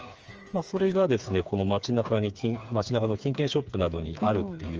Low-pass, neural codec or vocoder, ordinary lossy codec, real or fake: 7.2 kHz; codec, 16 kHz, 4 kbps, FreqCodec, smaller model; Opus, 24 kbps; fake